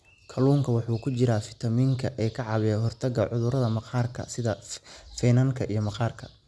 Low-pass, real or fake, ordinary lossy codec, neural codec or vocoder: none; real; none; none